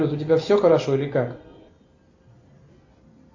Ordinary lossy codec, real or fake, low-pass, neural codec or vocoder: AAC, 48 kbps; real; 7.2 kHz; none